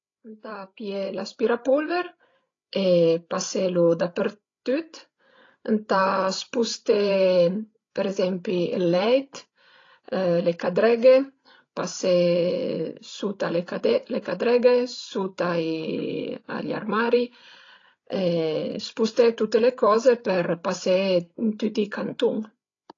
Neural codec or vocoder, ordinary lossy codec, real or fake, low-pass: codec, 16 kHz, 16 kbps, FreqCodec, larger model; AAC, 32 kbps; fake; 7.2 kHz